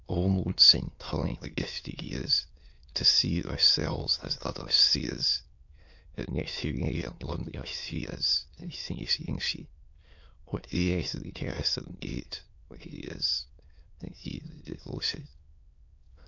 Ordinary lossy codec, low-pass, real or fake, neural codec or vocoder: MP3, 48 kbps; 7.2 kHz; fake; autoencoder, 22.05 kHz, a latent of 192 numbers a frame, VITS, trained on many speakers